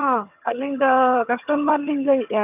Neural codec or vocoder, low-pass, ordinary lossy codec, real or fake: vocoder, 22.05 kHz, 80 mel bands, HiFi-GAN; 3.6 kHz; none; fake